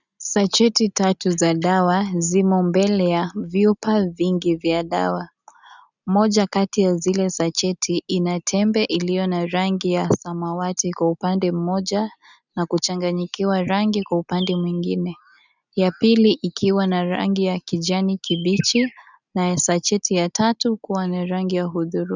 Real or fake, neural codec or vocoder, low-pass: real; none; 7.2 kHz